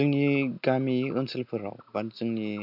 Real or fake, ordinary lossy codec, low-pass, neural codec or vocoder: real; none; 5.4 kHz; none